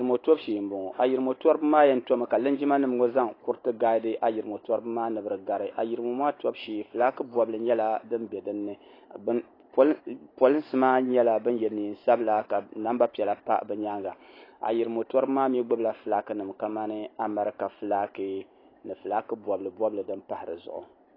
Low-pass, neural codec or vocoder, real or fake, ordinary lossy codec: 5.4 kHz; none; real; AAC, 24 kbps